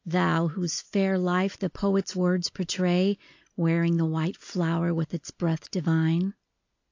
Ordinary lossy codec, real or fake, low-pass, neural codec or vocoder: AAC, 48 kbps; real; 7.2 kHz; none